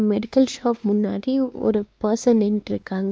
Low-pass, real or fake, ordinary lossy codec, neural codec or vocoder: none; fake; none; codec, 16 kHz, 2 kbps, X-Codec, WavLM features, trained on Multilingual LibriSpeech